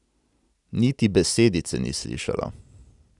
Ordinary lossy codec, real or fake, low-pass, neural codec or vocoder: none; real; 10.8 kHz; none